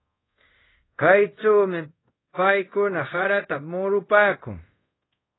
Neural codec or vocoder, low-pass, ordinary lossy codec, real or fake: codec, 24 kHz, 0.5 kbps, DualCodec; 7.2 kHz; AAC, 16 kbps; fake